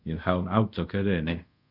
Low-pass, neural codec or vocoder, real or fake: 5.4 kHz; codec, 24 kHz, 0.5 kbps, DualCodec; fake